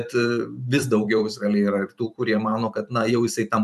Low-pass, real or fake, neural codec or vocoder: 14.4 kHz; fake; vocoder, 44.1 kHz, 128 mel bands every 512 samples, BigVGAN v2